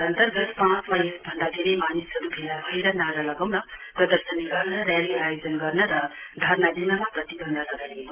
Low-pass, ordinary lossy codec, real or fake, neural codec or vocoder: 3.6 kHz; Opus, 16 kbps; real; none